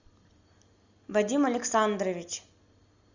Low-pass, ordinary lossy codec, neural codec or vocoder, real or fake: 7.2 kHz; Opus, 64 kbps; none; real